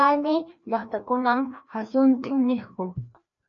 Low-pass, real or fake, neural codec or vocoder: 7.2 kHz; fake; codec, 16 kHz, 1 kbps, FreqCodec, larger model